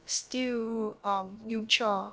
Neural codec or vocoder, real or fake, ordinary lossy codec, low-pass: codec, 16 kHz, about 1 kbps, DyCAST, with the encoder's durations; fake; none; none